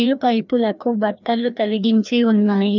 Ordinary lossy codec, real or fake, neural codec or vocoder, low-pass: none; fake; codec, 16 kHz, 1 kbps, FreqCodec, larger model; 7.2 kHz